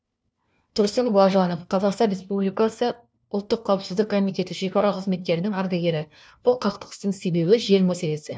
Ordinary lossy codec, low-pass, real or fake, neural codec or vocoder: none; none; fake; codec, 16 kHz, 1 kbps, FunCodec, trained on LibriTTS, 50 frames a second